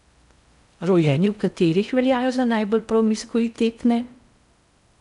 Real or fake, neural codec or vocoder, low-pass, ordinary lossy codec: fake; codec, 16 kHz in and 24 kHz out, 0.6 kbps, FocalCodec, streaming, 4096 codes; 10.8 kHz; none